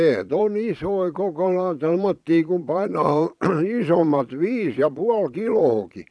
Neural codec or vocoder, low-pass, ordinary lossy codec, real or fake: vocoder, 22.05 kHz, 80 mel bands, Vocos; none; none; fake